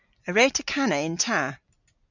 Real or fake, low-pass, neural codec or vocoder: real; 7.2 kHz; none